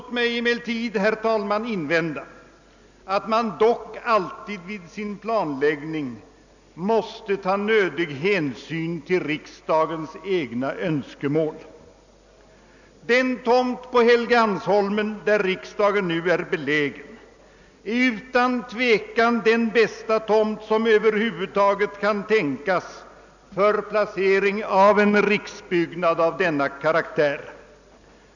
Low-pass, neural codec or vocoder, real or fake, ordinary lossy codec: 7.2 kHz; none; real; none